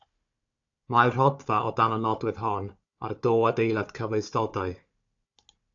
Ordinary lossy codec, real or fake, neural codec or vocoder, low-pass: AAC, 64 kbps; fake; codec, 16 kHz, 4 kbps, FunCodec, trained on Chinese and English, 50 frames a second; 7.2 kHz